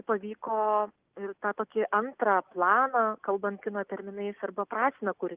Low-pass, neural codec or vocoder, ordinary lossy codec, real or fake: 3.6 kHz; none; Opus, 32 kbps; real